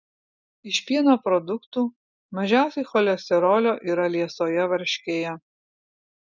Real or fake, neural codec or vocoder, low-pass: real; none; 7.2 kHz